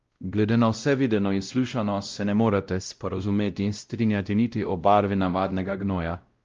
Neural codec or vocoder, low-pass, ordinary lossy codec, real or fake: codec, 16 kHz, 0.5 kbps, X-Codec, WavLM features, trained on Multilingual LibriSpeech; 7.2 kHz; Opus, 32 kbps; fake